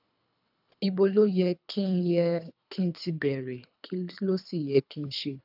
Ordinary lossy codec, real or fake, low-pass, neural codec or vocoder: none; fake; 5.4 kHz; codec, 24 kHz, 3 kbps, HILCodec